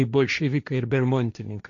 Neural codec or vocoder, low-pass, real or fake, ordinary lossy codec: codec, 16 kHz, 1.1 kbps, Voila-Tokenizer; 7.2 kHz; fake; MP3, 96 kbps